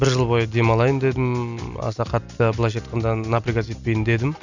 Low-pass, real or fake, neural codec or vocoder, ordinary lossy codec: 7.2 kHz; real; none; none